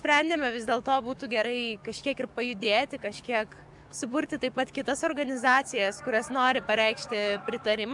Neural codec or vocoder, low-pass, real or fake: codec, 44.1 kHz, 7.8 kbps, DAC; 10.8 kHz; fake